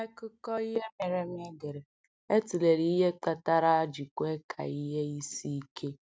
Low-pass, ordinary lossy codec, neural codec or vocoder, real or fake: none; none; none; real